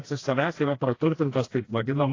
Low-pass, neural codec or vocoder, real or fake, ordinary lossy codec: 7.2 kHz; codec, 16 kHz, 1 kbps, FreqCodec, smaller model; fake; AAC, 32 kbps